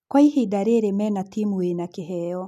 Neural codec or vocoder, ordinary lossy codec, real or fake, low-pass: none; none; real; 14.4 kHz